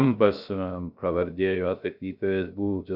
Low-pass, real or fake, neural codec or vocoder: 5.4 kHz; fake; codec, 16 kHz, 0.3 kbps, FocalCodec